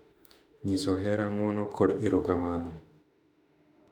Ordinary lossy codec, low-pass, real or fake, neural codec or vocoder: none; 19.8 kHz; fake; autoencoder, 48 kHz, 32 numbers a frame, DAC-VAE, trained on Japanese speech